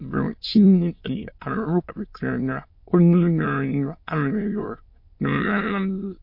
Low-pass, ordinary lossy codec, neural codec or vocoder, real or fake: 5.4 kHz; MP3, 32 kbps; autoencoder, 22.05 kHz, a latent of 192 numbers a frame, VITS, trained on many speakers; fake